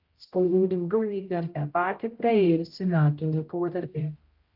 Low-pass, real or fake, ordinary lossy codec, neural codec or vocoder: 5.4 kHz; fake; Opus, 32 kbps; codec, 16 kHz, 0.5 kbps, X-Codec, HuBERT features, trained on general audio